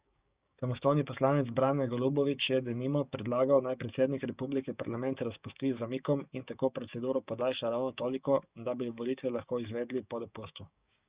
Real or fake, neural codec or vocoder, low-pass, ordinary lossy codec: fake; codec, 16 kHz, 6 kbps, DAC; 3.6 kHz; Opus, 64 kbps